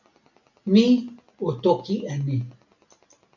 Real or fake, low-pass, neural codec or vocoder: real; 7.2 kHz; none